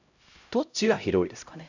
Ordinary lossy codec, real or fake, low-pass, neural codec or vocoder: none; fake; 7.2 kHz; codec, 16 kHz, 0.5 kbps, X-Codec, HuBERT features, trained on LibriSpeech